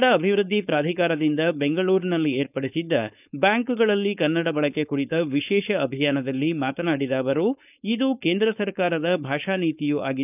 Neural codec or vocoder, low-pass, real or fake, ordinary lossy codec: codec, 16 kHz, 4.8 kbps, FACodec; 3.6 kHz; fake; none